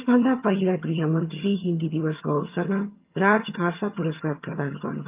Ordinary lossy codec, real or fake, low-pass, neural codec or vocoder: Opus, 24 kbps; fake; 3.6 kHz; vocoder, 22.05 kHz, 80 mel bands, HiFi-GAN